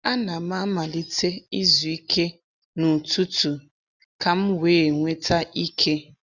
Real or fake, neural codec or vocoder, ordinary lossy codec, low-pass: real; none; none; 7.2 kHz